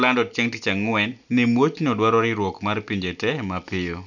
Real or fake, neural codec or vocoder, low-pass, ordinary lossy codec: real; none; 7.2 kHz; none